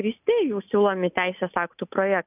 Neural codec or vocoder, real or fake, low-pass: none; real; 3.6 kHz